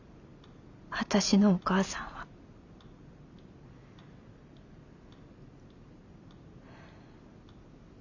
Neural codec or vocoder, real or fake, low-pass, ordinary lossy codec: none; real; 7.2 kHz; none